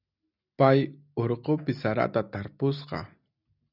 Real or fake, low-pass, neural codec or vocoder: real; 5.4 kHz; none